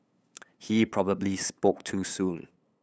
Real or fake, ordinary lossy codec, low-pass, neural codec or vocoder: fake; none; none; codec, 16 kHz, 8 kbps, FunCodec, trained on LibriTTS, 25 frames a second